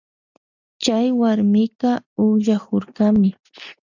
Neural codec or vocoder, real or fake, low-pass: none; real; 7.2 kHz